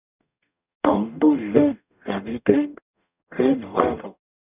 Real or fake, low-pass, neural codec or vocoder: fake; 3.6 kHz; codec, 44.1 kHz, 0.9 kbps, DAC